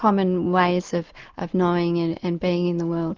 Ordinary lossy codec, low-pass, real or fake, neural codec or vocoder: Opus, 24 kbps; 7.2 kHz; real; none